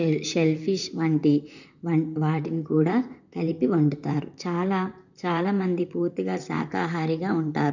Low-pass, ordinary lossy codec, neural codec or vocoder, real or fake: 7.2 kHz; none; vocoder, 44.1 kHz, 128 mel bands, Pupu-Vocoder; fake